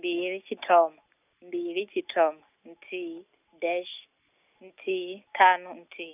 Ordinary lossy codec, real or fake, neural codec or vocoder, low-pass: none; real; none; 3.6 kHz